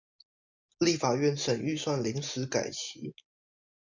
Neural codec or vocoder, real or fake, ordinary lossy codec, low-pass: vocoder, 44.1 kHz, 128 mel bands every 512 samples, BigVGAN v2; fake; MP3, 48 kbps; 7.2 kHz